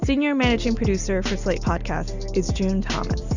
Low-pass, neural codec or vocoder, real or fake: 7.2 kHz; none; real